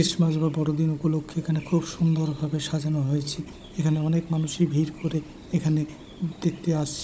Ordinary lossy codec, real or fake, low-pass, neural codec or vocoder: none; fake; none; codec, 16 kHz, 16 kbps, FunCodec, trained on Chinese and English, 50 frames a second